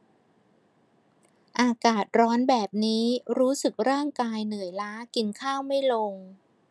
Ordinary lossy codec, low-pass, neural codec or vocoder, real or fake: none; none; none; real